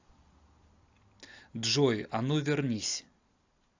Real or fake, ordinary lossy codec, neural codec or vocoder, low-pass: real; AAC, 48 kbps; none; 7.2 kHz